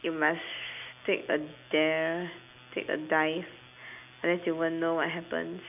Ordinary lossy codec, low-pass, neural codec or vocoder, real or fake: none; 3.6 kHz; none; real